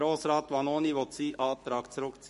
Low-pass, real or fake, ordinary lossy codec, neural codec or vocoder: 14.4 kHz; fake; MP3, 48 kbps; codec, 44.1 kHz, 7.8 kbps, Pupu-Codec